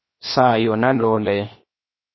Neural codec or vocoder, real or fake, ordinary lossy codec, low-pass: codec, 16 kHz, 0.7 kbps, FocalCodec; fake; MP3, 24 kbps; 7.2 kHz